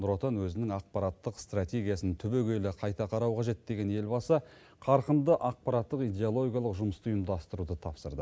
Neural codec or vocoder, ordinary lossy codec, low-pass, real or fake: none; none; none; real